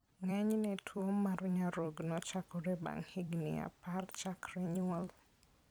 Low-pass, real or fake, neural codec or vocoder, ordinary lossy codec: none; fake; vocoder, 44.1 kHz, 128 mel bands every 256 samples, BigVGAN v2; none